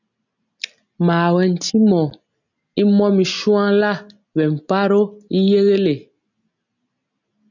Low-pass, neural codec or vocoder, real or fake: 7.2 kHz; none; real